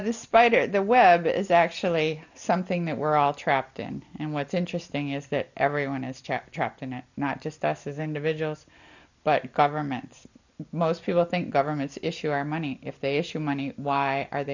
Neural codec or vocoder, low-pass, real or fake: none; 7.2 kHz; real